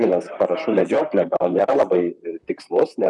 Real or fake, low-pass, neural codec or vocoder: fake; 10.8 kHz; vocoder, 44.1 kHz, 128 mel bands, Pupu-Vocoder